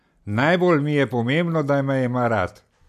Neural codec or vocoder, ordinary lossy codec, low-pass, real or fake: none; none; 14.4 kHz; real